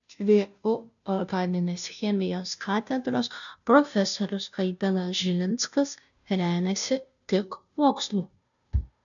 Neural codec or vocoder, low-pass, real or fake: codec, 16 kHz, 0.5 kbps, FunCodec, trained on Chinese and English, 25 frames a second; 7.2 kHz; fake